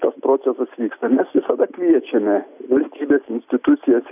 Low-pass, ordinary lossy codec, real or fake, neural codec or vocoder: 3.6 kHz; AAC, 32 kbps; real; none